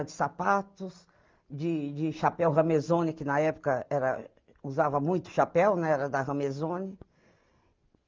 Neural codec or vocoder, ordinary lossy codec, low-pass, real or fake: none; Opus, 24 kbps; 7.2 kHz; real